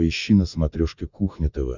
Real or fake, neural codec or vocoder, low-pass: real; none; 7.2 kHz